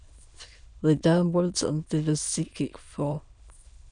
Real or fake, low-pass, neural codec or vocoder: fake; 9.9 kHz; autoencoder, 22.05 kHz, a latent of 192 numbers a frame, VITS, trained on many speakers